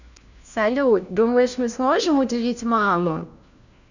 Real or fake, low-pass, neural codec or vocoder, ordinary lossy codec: fake; 7.2 kHz; codec, 16 kHz, 1 kbps, FunCodec, trained on LibriTTS, 50 frames a second; none